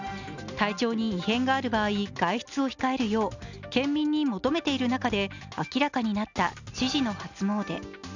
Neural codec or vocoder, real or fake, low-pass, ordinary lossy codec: none; real; 7.2 kHz; none